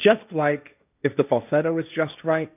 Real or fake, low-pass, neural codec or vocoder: fake; 3.6 kHz; codec, 16 kHz, 1.1 kbps, Voila-Tokenizer